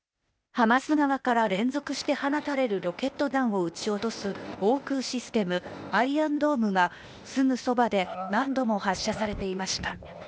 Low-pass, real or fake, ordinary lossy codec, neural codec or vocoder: none; fake; none; codec, 16 kHz, 0.8 kbps, ZipCodec